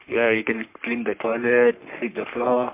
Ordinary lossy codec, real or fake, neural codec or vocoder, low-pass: MP3, 32 kbps; fake; codec, 44.1 kHz, 3.4 kbps, Pupu-Codec; 3.6 kHz